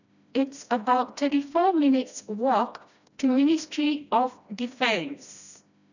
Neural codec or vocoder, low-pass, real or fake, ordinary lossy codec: codec, 16 kHz, 1 kbps, FreqCodec, smaller model; 7.2 kHz; fake; none